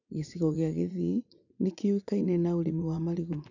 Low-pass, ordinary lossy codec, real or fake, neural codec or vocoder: 7.2 kHz; none; fake; vocoder, 44.1 kHz, 80 mel bands, Vocos